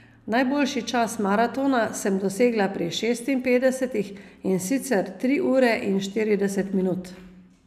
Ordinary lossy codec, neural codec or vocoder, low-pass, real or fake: none; none; 14.4 kHz; real